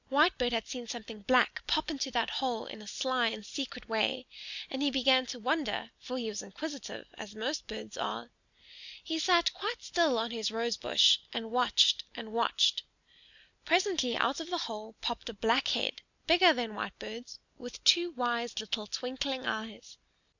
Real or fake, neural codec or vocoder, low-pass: real; none; 7.2 kHz